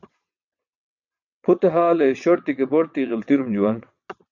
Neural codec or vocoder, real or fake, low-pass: vocoder, 22.05 kHz, 80 mel bands, WaveNeXt; fake; 7.2 kHz